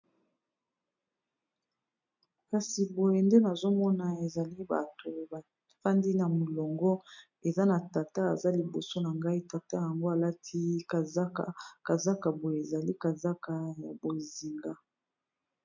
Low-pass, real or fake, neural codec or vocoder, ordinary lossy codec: 7.2 kHz; real; none; MP3, 64 kbps